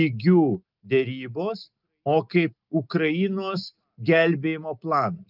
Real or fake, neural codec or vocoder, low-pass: real; none; 5.4 kHz